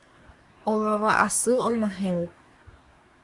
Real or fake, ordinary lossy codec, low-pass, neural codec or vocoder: fake; Opus, 64 kbps; 10.8 kHz; codec, 24 kHz, 1 kbps, SNAC